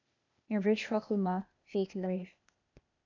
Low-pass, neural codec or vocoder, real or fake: 7.2 kHz; codec, 16 kHz, 0.8 kbps, ZipCodec; fake